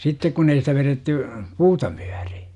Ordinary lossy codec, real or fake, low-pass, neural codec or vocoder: none; real; 10.8 kHz; none